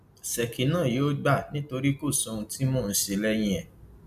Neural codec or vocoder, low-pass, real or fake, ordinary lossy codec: none; 14.4 kHz; real; none